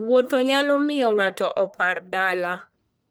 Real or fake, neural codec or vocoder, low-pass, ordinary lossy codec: fake; codec, 44.1 kHz, 1.7 kbps, Pupu-Codec; none; none